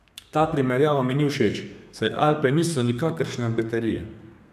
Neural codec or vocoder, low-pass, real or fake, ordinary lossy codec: codec, 32 kHz, 1.9 kbps, SNAC; 14.4 kHz; fake; none